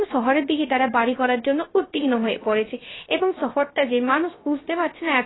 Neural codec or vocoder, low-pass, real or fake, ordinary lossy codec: codec, 16 kHz, 0.3 kbps, FocalCodec; 7.2 kHz; fake; AAC, 16 kbps